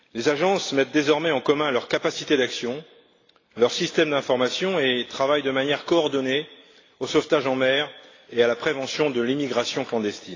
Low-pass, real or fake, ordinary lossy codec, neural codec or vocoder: 7.2 kHz; real; AAC, 32 kbps; none